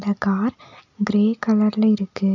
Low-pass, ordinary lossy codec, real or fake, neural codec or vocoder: 7.2 kHz; none; real; none